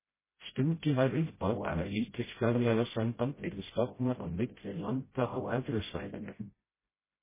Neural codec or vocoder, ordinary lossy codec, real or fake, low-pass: codec, 16 kHz, 0.5 kbps, FreqCodec, smaller model; MP3, 16 kbps; fake; 3.6 kHz